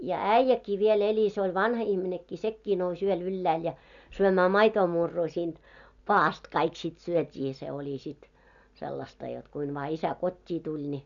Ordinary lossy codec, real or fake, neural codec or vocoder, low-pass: none; real; none; 7.2 kHz